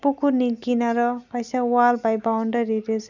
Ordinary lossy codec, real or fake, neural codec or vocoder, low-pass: none; real; none; 7.2 kHz